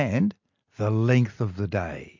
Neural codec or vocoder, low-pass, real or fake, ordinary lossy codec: none; 7.2 kHz; real; MP3, 48 kbps